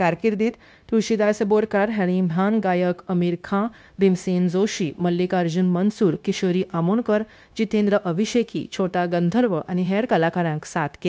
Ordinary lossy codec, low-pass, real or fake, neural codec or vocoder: none; none; fake; codec, 16 kHz, 0.9 kbps, LongCat-Audio-Codec